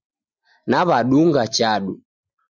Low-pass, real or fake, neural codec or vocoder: 7.2 kHz; real; none